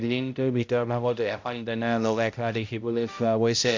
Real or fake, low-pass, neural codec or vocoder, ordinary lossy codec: fake; 7.2 kHz; codec, 16 kHz, 0.5 kbps, X-Codec, HuBERT features, trained on balanced general audio; MP3, 48 kbps